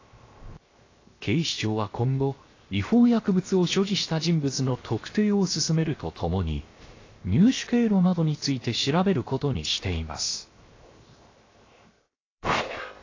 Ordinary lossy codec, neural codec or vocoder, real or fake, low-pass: AAC, 32 kbps; codec, 16 kHz, 0.7 kbps, FocalCodec; fake; 7.2 kHz